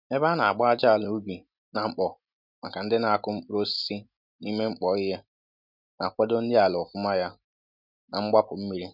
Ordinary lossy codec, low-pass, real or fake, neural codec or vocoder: none; 5.4 kHz; real; none